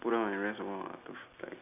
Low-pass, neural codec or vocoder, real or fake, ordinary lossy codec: 3.6 kHz; none; real; none